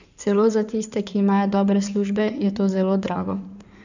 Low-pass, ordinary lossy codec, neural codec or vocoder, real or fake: 7.2 kHz; none; codec, 16 kHz in and 24 kHz out, 2.2 kbps, FireRedTTS-2 codec; fake